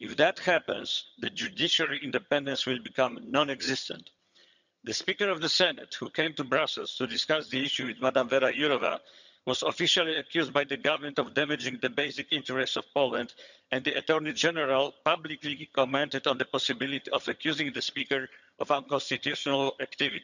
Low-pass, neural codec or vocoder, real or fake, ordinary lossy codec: 7.2 kHz; vocoder, 22.05 kHz, 80 mel bands, HiFi-GAN; fake; none